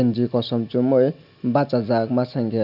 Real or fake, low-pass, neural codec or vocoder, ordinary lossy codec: real; 5.4 kHz; none; none